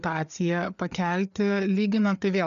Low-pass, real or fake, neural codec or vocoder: 7.2 kHz; fake; codec, 16 kHz, 4 kbps, FreqCodec, larger model